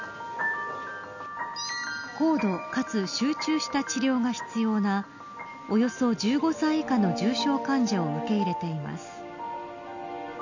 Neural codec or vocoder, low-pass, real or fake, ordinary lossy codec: none; 7.2 kHz; real; none